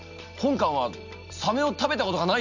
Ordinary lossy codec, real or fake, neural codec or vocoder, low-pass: none; real; none; 7.2 kHz